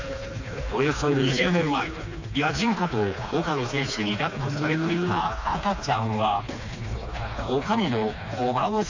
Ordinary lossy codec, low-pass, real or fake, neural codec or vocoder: AAC, 48 kbps; 7.2 kHz; fake; codec, 16 kHz, 2 kbps, FreqCodec, smaller model